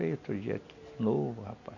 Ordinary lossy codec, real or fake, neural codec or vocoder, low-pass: none; real; none; 7.2 kHz